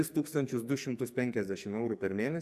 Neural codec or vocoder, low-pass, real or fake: codec, 44.1 kHz, 2.6 kbps, SNAC; 14.4 kHz; fake